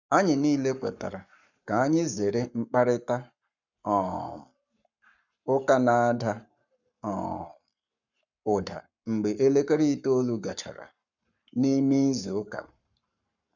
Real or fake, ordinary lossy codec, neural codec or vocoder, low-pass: fake; none; codec, 44.1 kHz, 7.8 kbps, Pupu-Codec; 7.2 kHz